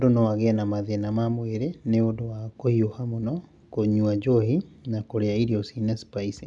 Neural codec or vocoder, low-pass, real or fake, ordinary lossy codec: none; 10.8 kHz; real; none